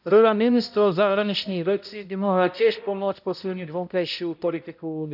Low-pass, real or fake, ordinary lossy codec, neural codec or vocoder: 5.4 kHz; fake; none; codec, 16 kHz, 0.5 kbps, X-Codec, HuBERT features, trained on balanced general audio